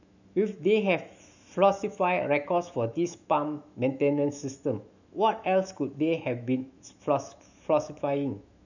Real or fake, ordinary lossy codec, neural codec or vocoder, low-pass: fake; none; autoencoder, 48 kHz, 128 numbers a frame, DAC-VAE, trained on Japanese speech; 7.2 kHz